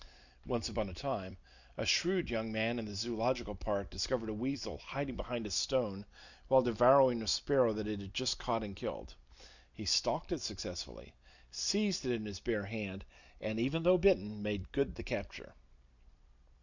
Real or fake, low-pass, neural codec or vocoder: real; 7.2 kHz; none